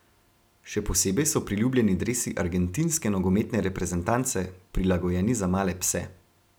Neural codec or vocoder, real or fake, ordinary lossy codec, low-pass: none; real; none; none